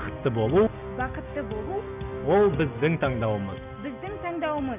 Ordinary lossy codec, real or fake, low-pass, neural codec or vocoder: none; real; 3.6 kHz; none